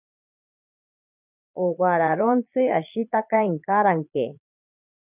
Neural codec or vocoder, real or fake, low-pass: vocoder, 22.05 kHz, 80 mel bands, Vocos; fake; 3.6 kHz